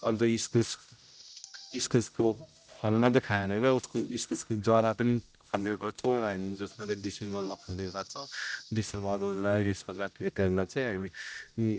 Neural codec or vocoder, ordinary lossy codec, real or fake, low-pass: codec, 16 kHz, 0.5 kbps, X-Codec, HuBERT features, trained on general audio; none; fake; none